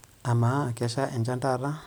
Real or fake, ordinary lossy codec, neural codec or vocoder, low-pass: real; none; none; none